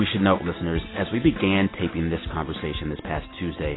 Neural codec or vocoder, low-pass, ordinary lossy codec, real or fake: none; 7.2 kHz; AAC, 16 kbps; real